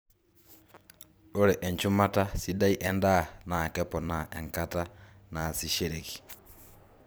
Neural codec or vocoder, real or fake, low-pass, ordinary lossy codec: vocoder, 44.1 kHz, 128 mel bands every 256 samples, BigVGAN v2; fake; none; none